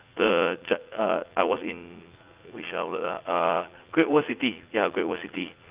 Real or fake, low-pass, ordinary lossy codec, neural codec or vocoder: fake; 3.6 kHz; Opus, 32 kbps; vocoder, 44.1 kHz, 80 mel bands, Vocos